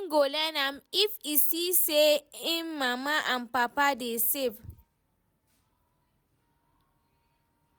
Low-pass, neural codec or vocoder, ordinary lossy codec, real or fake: none; none; none; real